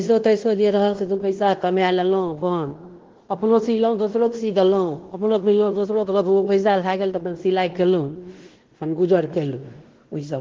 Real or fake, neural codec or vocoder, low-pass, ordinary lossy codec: fake; codec, 16 kHz in and 24 kHz out, 0.9 kbps, LongCat-Audio-Codec, fine tuned four codebook decoder; 7.2 kHz; Opus, 16 kbps